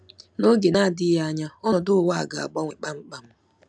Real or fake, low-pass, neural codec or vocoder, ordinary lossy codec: real; none; none; none